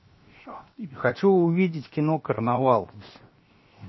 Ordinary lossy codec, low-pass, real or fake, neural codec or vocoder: MP3, 24 kbps; 7.2 kHz; fake; codec, 16 kHz, 0.7 kbps, FocalCodec